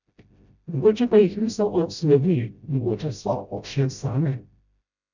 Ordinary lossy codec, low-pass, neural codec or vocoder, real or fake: none; 7.2 kHz; codec, 16 kHz, 0.5 kbps, FreqCodec, smaller model; fake